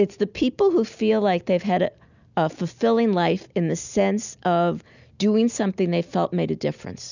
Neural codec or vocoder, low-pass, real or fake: none; 7.2 kHz; real